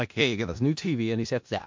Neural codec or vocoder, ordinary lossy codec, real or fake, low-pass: codec, 16 kHz in and 24 kHz out, 0.4 kbps, LongCat-Audio-Codec, four codebook decoder; MP3, 48 kbps; fake; 7.2 kHz